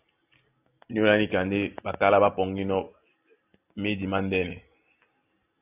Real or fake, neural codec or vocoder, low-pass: real; none; 3.6 kHz